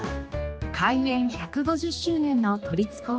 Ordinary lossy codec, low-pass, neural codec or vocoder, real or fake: none; none; codec, 16 kHz, 2 kbps, X-Codec, HuBERT features, trained on general audio; fake